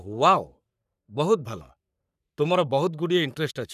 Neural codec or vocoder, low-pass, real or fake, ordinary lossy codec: codec, 44.1 kHz, 3.4 kbps, Pupu-Codec; 14.4 kHz; fake; none